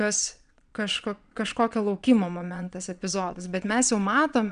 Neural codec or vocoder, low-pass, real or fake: vocoder, 22.05 kHz, 80 mel bands, Vocos; 9.9 kHz; fake